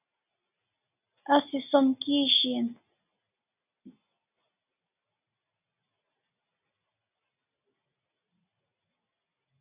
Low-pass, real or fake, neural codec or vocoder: 3.6 kHz; real; none